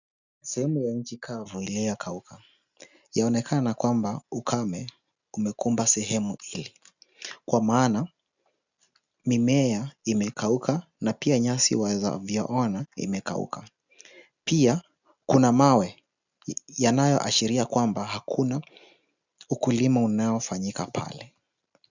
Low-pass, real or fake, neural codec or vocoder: 7.2 kHz; real; none